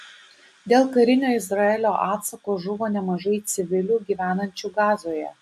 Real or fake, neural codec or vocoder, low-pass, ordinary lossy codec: real; none; 14.4 kHz; AAC, 96 kbps